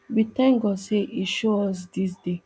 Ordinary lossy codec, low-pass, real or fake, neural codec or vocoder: none; none; real; none